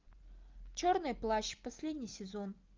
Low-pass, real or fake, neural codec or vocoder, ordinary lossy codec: 7.2 kHz; real; none; Opus, 24 kbps